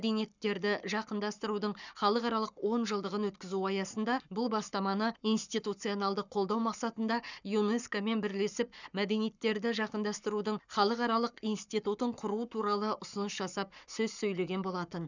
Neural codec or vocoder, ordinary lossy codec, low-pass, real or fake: codec, 44.1 kHz, 7.8 kbps, Pupu-Codec; none; 7.2 kHz; fake